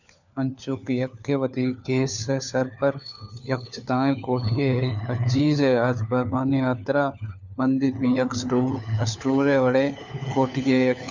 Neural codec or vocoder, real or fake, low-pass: codec, 16 kHz, 4 kbps, FunCodec, trained on LibriTTS, 50 frames a second; fake; 7.2 kHz